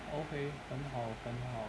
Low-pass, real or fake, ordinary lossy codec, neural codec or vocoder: none; real; none; none